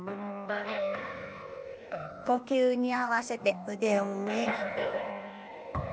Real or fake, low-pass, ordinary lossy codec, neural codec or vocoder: fake; none; none; codec, 16 kHz, 0.8 kbps, ZipCodec